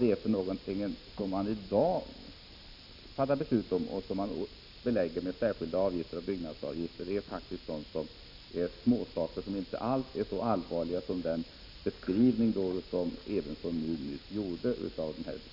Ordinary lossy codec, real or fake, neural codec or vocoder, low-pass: none; real; none; 5.4 kHz